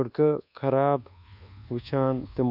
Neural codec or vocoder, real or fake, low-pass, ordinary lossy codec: codec, 24 kHz, 1.2 kbps, DualCodec; fake; 5.4 kHz; none